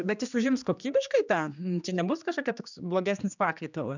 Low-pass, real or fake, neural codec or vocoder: 7.2 kHz; fake; codec, 16 kHz, 2 kbps, X-Codec, HuBERT features, trained on general audio